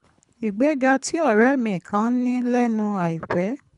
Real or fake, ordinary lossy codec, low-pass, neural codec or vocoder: fake; none; 10.8 kHz; codec, 24 kHz, 3 kbps, HILCodec